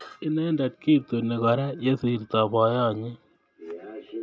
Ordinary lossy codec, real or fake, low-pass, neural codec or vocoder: none; real; none; none